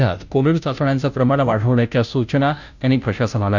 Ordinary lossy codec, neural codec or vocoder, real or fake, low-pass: none; codec, 16 kHz, 0.5 kbps, FunCodec, trained on Chinese and English, 25 frames a second; fake; 7.2 kHz